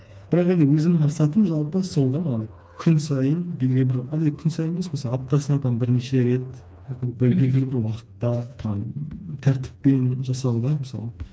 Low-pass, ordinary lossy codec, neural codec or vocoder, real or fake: none; none; codec, 16 kHz, 2 kbps, FreqCodec, smaller model; fake